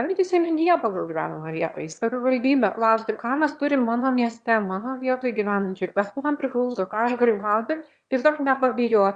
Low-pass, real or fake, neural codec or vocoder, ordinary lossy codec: 9.9 kHz; fake; autoencoder, 22.05 kHz, a latent of 192 numbers a frame, VITS, trained on one speaker; Opus, 64 kbps